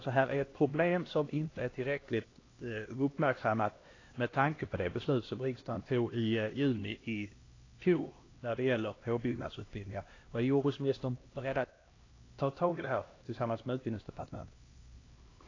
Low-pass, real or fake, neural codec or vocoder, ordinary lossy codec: 7.2 kHz; fake; codec, 16 kHz, 1 kbps, X-Codec, HuBERT features, trained on LibriSpeech; AAC, 32 kbps